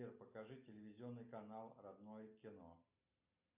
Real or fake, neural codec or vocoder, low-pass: real; none; 3.6 kHz